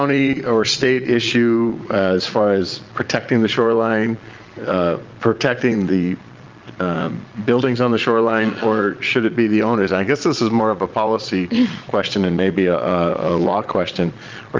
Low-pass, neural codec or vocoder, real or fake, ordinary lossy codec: 7.2 kHz; vocoder, 22.05 kHz, 80 mel bands, Vocos; fake; Opus, 24 kbps